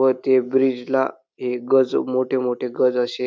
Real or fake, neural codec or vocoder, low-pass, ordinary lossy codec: real; none; none; none